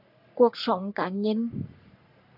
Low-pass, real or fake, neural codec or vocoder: 5.4 kHz; fake; codec, 44.1 kHz, 3.4 kbps, Pupu-Codec